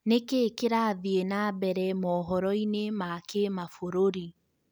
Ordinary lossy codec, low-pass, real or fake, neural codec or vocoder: none; none; real; none